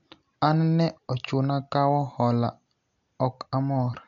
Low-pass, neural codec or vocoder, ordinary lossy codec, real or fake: 7.2 kHz; none; none; real